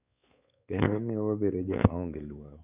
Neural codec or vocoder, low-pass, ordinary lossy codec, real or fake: codec, 16 kHz, 4 kbps, X-Codec, WavLM features, trained on Multilingual LibriSpeech; 3.6 kHz; none; fake